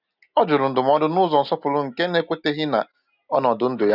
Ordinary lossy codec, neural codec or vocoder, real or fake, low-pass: none; none; real; 5.4 kHz